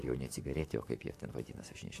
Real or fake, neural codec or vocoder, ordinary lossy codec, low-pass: fake; vocoder, 44.1 kHz, 128 mel bands, Pupu-Vocoder; AAC, 64 kbps; 14.4 kHz